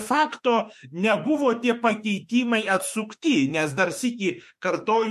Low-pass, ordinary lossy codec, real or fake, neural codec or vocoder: 14.4 kHz; MP3, 64 kbps; fake; autoencoder, 48 kHz, 32 numbers a frame, DAC-VAE, trained on Japanese speech